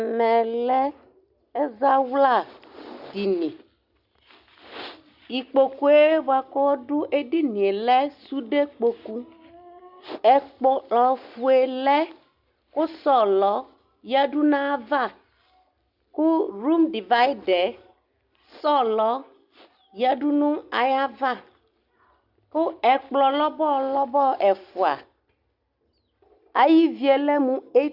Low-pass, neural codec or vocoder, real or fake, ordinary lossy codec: 5.4 kHz; none; real; Opus, 64 kbps